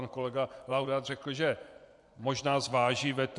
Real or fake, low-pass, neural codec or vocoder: fake; 10.8 kHz; vocoder, 24 kHz, 100 mel bands, Vocos